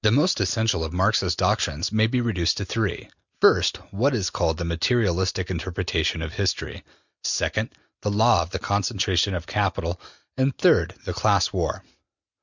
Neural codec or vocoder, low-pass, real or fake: none; 7.2 kHz; real